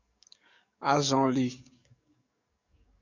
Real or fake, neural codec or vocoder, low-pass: fake; codec, 44.1 kHz, 7.8 kbps, DAC; 7.2 kHz